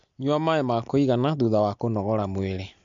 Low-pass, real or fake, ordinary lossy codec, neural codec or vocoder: 7.2 kHz; real; MP3, 64 kbps; none